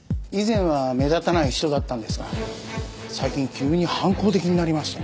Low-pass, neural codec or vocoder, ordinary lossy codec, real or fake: none; none; none; real